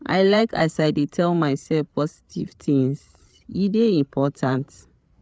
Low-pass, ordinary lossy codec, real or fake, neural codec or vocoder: none; none; fake; codec, 16 kHz, 8 kbps, FreqCodec, larger model